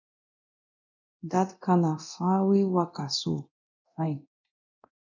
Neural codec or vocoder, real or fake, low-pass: codec, 24 kHz, 0.9 kbps, DualCodec; fake; 7.2 kHz